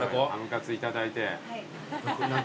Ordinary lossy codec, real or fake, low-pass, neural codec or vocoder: none; real; none; none